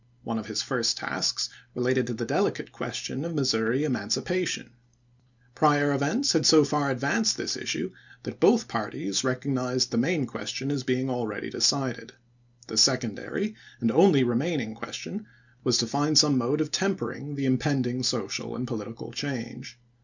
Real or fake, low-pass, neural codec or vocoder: real; 7.2 kHz; none